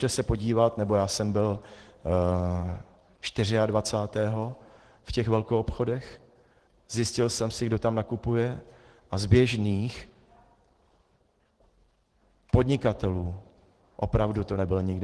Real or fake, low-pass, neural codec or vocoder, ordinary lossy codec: fake; 10.8 kHz; vocoder, 44.1 kHz, 128 mel bands every 512 samples, BigVGAN v2; Opus, 16 kbps